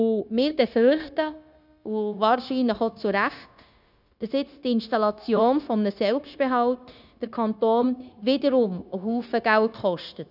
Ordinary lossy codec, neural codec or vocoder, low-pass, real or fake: none; codec, 16 kHz, 0.9 kbps, LongCat-Audio-Codec; 5.4 kHz; fake